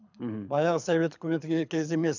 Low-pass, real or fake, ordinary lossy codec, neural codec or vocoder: 7.2 kHz; fake; none; codec, 24 kHz, 6 kbps, HILCodec